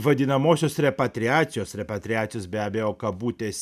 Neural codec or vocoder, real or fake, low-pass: none; real; 14.4 kHz